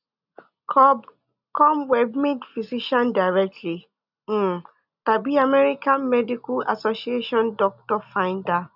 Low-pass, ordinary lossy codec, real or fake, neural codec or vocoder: 5.4 kHz; none; real; none